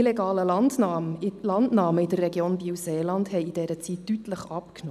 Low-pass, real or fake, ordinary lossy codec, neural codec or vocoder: 14.4 kHz; real; none; none